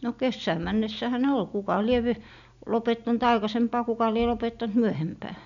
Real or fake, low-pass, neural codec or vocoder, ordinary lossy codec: real; 7.2 kHz; none; none